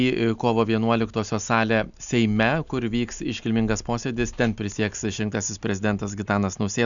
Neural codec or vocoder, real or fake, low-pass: none; real; 7.2 kHz